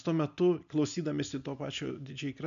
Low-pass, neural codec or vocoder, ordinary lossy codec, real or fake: 7.2 kHz; none; MP3, 64 kbps; real